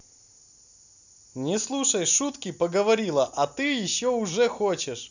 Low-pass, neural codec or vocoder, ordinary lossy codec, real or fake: 7.2 kHz; none; none; real